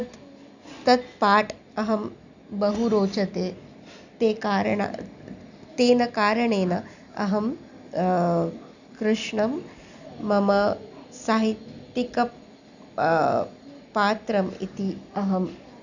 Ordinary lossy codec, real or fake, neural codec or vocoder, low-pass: none; real; none; 7.2 kHz